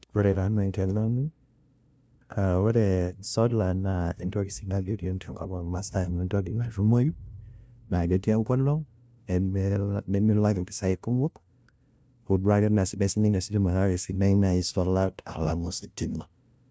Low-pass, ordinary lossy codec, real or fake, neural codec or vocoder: none; none; fake; codec, 16 kHz, 0.5 kbps, FunCodec, trained on LibriTTS, 25 frames a second